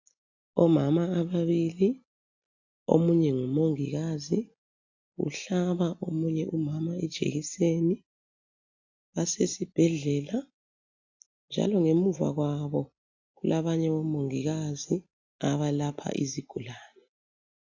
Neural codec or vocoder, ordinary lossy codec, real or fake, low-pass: none; AAC, 48 kbps; real; 7.2 kHz